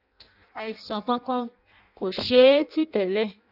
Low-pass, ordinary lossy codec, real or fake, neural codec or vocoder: 5.4 kHz; none; fake; codec, 16 kHz in and 24 kHz out, 0.6 kbps, FireRedTTS-2 codec